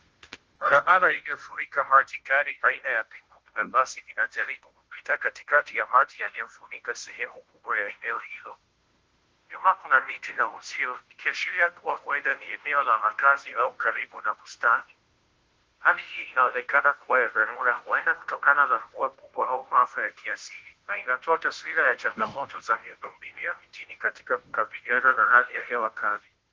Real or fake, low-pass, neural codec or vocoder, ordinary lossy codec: fake; 7.2 kHz; codec, 16 kHz, 0.5 kbps, FunCodec, trained on Chinese and English, 25 frames a second; Opus, 16 kbps